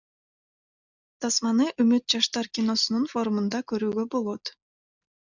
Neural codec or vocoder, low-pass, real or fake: none; 7.2 kHz; real